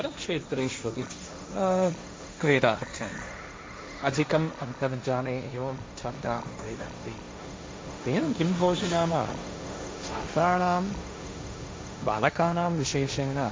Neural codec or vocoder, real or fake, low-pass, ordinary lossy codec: codec, 16 kHz, 1.1 kbps, Voila-Tokenizer; fake; none; none